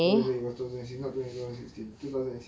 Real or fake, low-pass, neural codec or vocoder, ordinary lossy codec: real; none; none; none